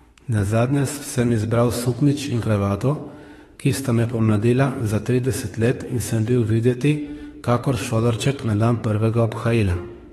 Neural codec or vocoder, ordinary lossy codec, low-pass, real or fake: autoencoder, 48 kHz, 32 numbers a frame, DAC-VAE, trained on Japanese speech; AAC, 32 kbps; 19.8 kHz; fake